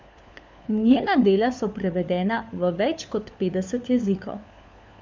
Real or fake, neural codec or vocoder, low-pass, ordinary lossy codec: fake; codec, 16 kHz, 4 kbps, FunCodec, trained on LibriTTS, 50 frames a second; none; none